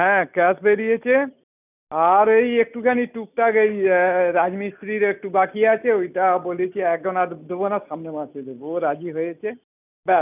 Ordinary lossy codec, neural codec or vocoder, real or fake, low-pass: none; none; real; 3.6 kHz